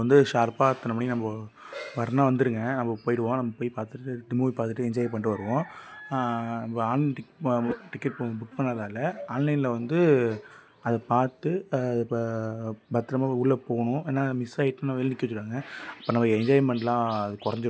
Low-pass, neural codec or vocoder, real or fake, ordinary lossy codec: none; none; real; none